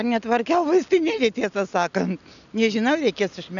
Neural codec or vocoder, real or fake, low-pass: none; real; 7.2 kHz